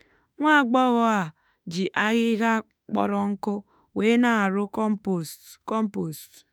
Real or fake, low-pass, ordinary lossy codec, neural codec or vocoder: fake; none; none; autoencoder, 48 kHz, 32 numbers a frame, DAC-VAE, trained on Japanese speech